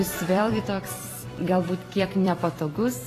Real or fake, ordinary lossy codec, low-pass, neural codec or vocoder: fake; AAC, 48 kbps; 14.4 kHz; vocoder, 44.1 kHz, 128 mel bands every 256 samples, BigVGAN v2